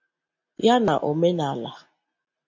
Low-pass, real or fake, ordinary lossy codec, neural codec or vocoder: 7.2 kHz; real; MP3, 48 kbps; none